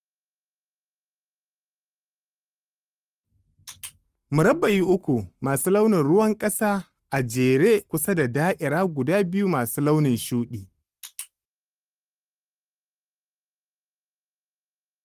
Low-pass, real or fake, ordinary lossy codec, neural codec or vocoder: 14.4 kHz; fake; Opus, 32 kbps; codec, 44.1 kHz, 7.8 kbps, Pupu-Codec